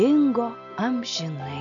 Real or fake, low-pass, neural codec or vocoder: real; 7.2 kHz; none